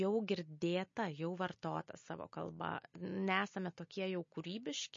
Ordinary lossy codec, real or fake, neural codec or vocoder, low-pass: MP3, 32 kbps; real; none; 7.2 kHz